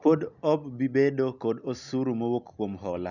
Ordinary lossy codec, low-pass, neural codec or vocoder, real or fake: none; 7.2 kHz; none; real